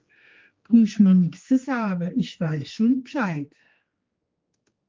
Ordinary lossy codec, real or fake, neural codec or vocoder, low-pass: Opus, 24 kbps; fake; codec, 16 kHz, 2 kbps, X-Codec, HuBERT features, trained on general audio; 7.2 kHz